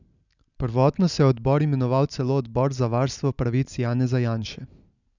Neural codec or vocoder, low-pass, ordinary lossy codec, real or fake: none; 7.2 kHz; none; real